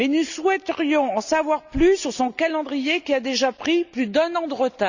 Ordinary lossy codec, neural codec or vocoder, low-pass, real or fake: none; none; 7.2 kHz; real